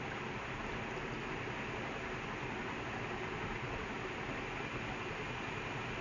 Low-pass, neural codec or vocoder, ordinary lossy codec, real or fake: 7.2 kHz; none; none; real